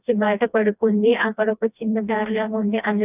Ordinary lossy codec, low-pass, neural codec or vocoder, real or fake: none; 3.6 kHz; codec, 16 kHz, 1 kbps, FreqCodec, smaller model; fake